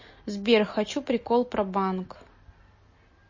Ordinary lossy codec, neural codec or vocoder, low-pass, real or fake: MP3, 32 kbps; none; 7.2 kHz; real